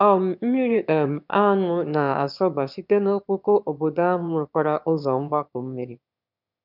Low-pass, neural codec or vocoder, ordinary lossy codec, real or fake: 5.4 kHz; autoencoder, 22.05 kHz, a latent of 192 numbers a frame, VITS, trained on one speaker; none; fake